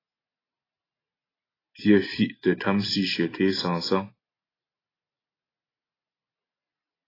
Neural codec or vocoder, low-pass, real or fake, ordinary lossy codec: none; 5.4 kHz; real; AAC, 24 kbps